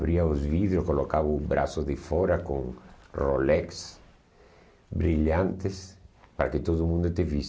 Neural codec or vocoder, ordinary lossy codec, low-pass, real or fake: none; none; none; real